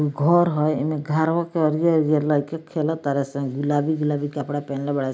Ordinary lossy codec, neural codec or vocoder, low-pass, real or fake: none; none; none; real